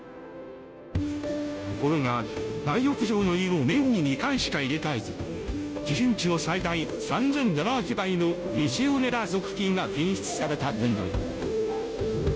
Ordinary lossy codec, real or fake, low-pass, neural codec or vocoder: none; fake; none; codec, 16 kHz, 0.5 kbps, FunCodec, trained on Chinese and English, 25 frames a second